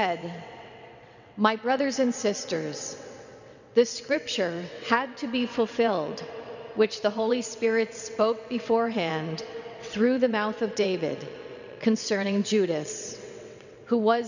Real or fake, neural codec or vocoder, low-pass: fake; vocoder, 22.05 kHz, 80 mel bands, WaveNeXt; 7.2 kHz